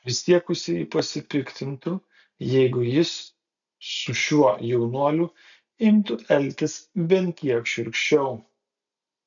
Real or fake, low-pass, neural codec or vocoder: real; 7.2 kHz; none